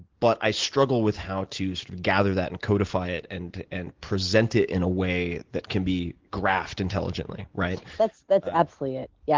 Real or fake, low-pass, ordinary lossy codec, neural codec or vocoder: real; 7.2 kHz; Opus, 16 kbps; none